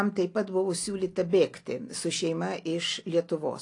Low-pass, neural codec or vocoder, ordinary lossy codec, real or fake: 10.8 kHz; none; AAC, 48 kbps; real